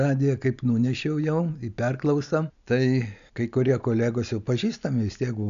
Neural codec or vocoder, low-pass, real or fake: none; 7.2 kHz; real